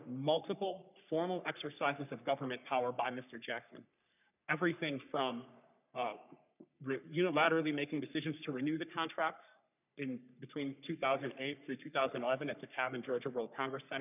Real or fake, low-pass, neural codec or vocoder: fake; 3.6 kHz; codec, 44.1 kHz, 3.4 kbps, Pupu-Codec